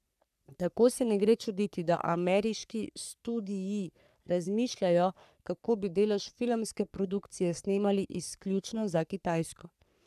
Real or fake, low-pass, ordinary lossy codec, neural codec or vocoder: fake; 14.4 kHz; none; codec, 44.1 kHz, 3.4 kbps, Pupu-Codec